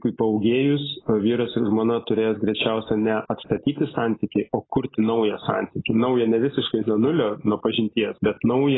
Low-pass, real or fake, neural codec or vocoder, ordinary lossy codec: 7.2 kHz; real; none; AAC, 16 kbps